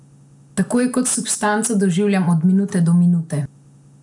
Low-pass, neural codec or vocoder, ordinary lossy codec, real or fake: 10.8 kHz; none; none; real